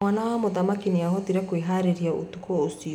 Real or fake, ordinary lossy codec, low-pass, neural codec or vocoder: real; none; 19.8 kHz; none